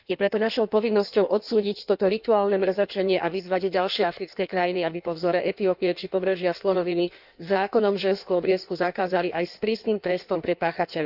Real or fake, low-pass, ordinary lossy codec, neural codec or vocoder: fake; 5.4 kHz; none; codec, 16 kHz in and 24 kHz out, 1.1 kbps, FireRedTTS-2 codec